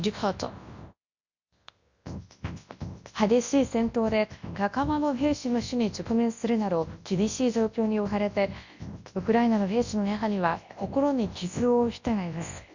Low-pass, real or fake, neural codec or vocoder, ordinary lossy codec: 7.2 kHz; fake; codec, 24 kHz, 0.9 kbps, WavTokenizer, large speech release; Opus, 64 kbps